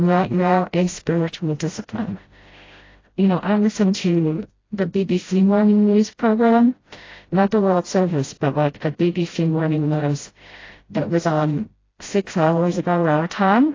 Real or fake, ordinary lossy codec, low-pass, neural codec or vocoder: fake; AAC, 32 kbps; 7.2 kHz; codec, 16 kHz, 0.5 kbps, FreqCodec, smaller model